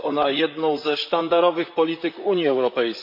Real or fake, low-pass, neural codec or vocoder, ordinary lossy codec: fake; 5.4 kHz; vocoder, 44.1 kHz, 128 mel bands, Pupu-Vocoder; MP3, 48 kbps